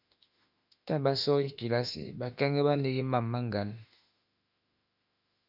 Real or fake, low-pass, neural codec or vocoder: fake; 5.4 kHz; autoencoder, 48 kHz, 32 numbers a frame, DAC-VAE, trained on Japanese speech